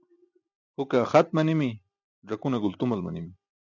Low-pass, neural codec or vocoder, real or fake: 7.2 kHz; none; real